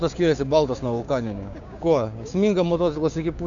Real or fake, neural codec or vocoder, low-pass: fake; codec, 16 kHz, 6 kbps, DAC; 7.2 kHz